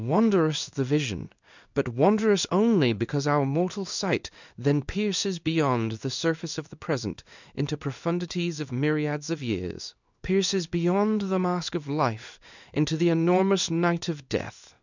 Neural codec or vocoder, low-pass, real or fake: codec, 16 kHz in and 24 kHz out, 1 kbps, XY-Tokenizer; 7.2 kHz; fake